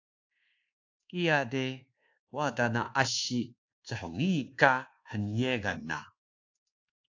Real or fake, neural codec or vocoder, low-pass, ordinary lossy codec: fake; codec, 24 kHz, 1.2 kbps, DualCodec; 7.2 kHz; AAC, 48 kbps